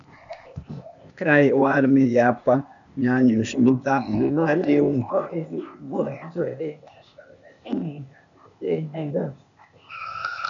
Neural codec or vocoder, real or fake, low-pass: codec, 16 kHz, 0.8 kbps, ZipCodec; fake; 7.2 kHz